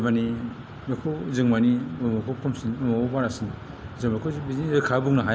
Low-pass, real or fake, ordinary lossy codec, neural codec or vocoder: none; real; none; none